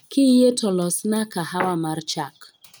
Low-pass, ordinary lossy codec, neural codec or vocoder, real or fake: none; none; none; real